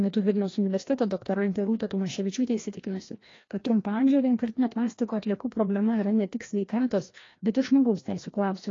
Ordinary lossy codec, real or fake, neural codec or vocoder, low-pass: AAC, 32 kbps; fake; codec, 16 kHz, 1 kbps, FreqCodec, larger model; 7.2 kHz